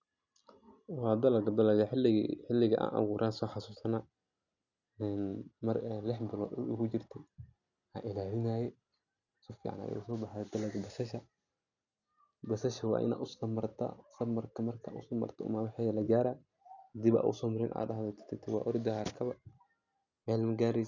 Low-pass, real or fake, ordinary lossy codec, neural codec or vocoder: 7.2 kHz; real; none; none